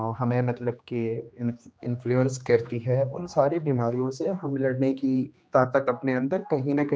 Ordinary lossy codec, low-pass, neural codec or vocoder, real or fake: none; none; codec, 16 kHz, 2 kbps, X-Codec, HuBERT features, trained on general audio; fake